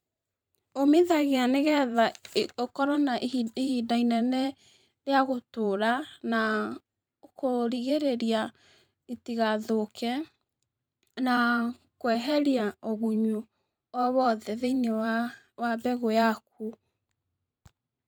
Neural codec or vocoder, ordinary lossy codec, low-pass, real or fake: vocoder, 44.1 kHz, 128 mel bands every 512 samples, BigVGAN v2; none; none; fake